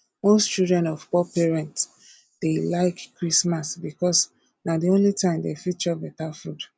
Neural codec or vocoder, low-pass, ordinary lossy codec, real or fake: none; none; none; real